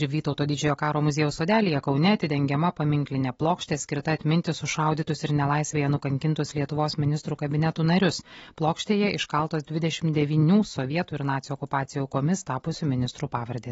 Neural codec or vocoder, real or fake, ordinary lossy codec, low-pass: none; real; AAC, 24 kbps; 19.8 kHz